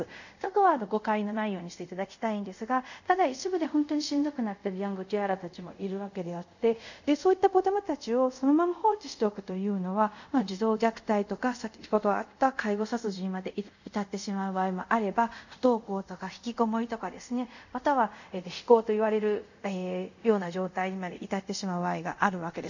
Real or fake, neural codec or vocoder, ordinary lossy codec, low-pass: fake; codec, 24 kHz, 0.5 kbps, DualCodec; none; 7.2 kHz